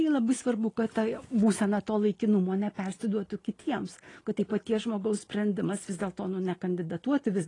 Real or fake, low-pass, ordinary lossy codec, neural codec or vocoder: real; 10.8 kHz; AAC, 32 kbps; none